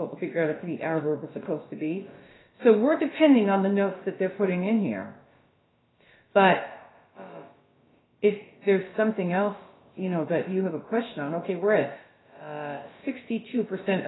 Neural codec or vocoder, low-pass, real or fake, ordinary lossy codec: codec, 16 kHz, about 1 kbps, DyCAST, with the encoder's durations; 7.2 kHz; fake; AAC, 16 kbps